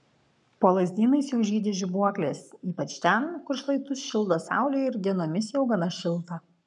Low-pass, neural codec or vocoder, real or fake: 10.8 kHz; codec, 44.1 kHz, 7.8 kbps, Pupu-Codec; fake